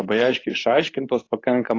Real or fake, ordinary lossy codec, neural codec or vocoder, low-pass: fake; AAC, 32 kbps; codec, 24 kHz, 0.9 kbps, WavTokenizer, medium speech release version 2; 7.2 kHz